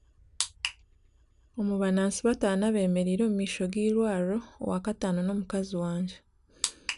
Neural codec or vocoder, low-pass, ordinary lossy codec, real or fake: none; 10.8 kHz; none; real